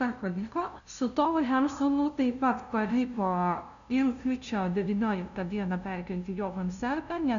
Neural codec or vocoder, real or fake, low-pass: codec, 16 kHz, 0.5 kbps, FunCodec, trained on LibriTTS, 25 frames a second; fake; 7.2 kHz